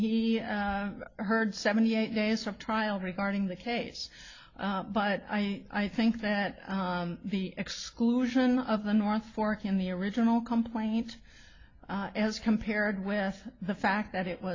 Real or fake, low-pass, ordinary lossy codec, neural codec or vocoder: real; 7.2 kHz; AAC, 48 kbps; none